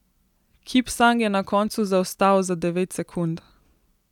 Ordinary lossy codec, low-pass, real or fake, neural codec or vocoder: none; 19.8 kHz; real; none